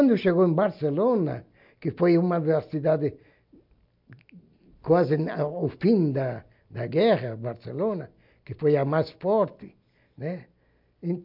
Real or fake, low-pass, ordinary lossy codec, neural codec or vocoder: real; 5.4 kHz; MP3, 48 kbps; none